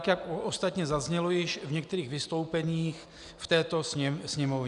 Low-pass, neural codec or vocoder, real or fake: 10.8 kHz; none; real